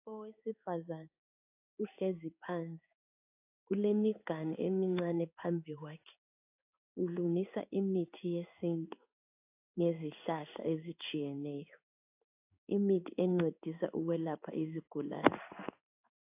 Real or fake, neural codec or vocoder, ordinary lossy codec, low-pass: fake; codec, 16 kHz in and 24 kHz out, 1 kbps, XY-Tokenizer; AAC, 32 kbps; 3.6 kHz